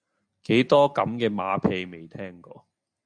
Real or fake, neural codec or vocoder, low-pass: real; none; 9.9 kHz